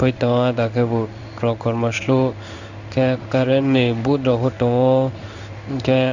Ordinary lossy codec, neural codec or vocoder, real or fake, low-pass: none; codec, 16 kHz in and 24 kHz out, 1 kbps, XY-Tokenizer; fake; 7.2 kHz